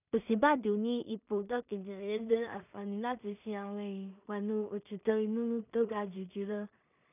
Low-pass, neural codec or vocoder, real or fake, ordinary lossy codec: 3.6 kHz; codec, 16 kHz in and 24 kHz out, 0.4 kbps, LongCat-Audio-Codec, two codebook decoder; fake; none